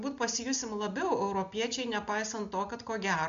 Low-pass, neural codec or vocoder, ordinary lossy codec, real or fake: 7.2 kHz; none; MP3, 64 kbps; real